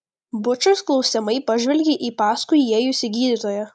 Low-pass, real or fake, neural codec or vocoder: 9.9 kHz; real; none